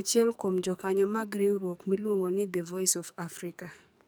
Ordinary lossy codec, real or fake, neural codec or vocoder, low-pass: none; fake; codec, 44.1 kHz, 2.6 kbps, SNAC; none